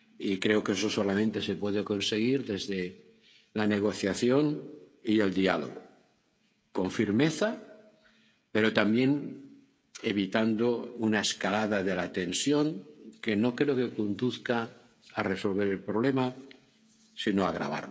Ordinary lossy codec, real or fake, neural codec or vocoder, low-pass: none; fake; codec, 16 kHz, 16 kbps, FreqCodec, smaller model; none